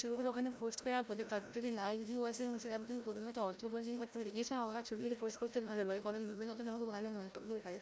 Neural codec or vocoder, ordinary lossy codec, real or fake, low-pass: codec, 16 kHz, 0.5 kbps, FreqCodec, larger model; none; fake; none